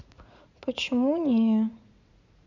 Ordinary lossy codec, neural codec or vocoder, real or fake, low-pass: none; vocoder, 44.1 kHz, 80 mel bands, Vocos; fake; 7.2 kHz